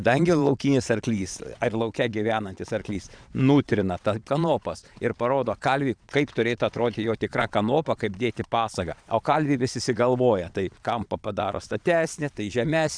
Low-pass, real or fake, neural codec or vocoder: 9.9 kHz; fake; vocoder, 22.05 kHz, 80 mel bands, Vocos